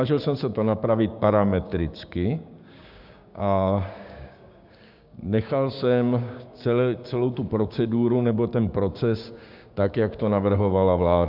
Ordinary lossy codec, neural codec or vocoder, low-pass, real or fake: Opus, 64 kbps; codec, 16 kHz, 6 kbps, DAC; 5.4 kHz; fake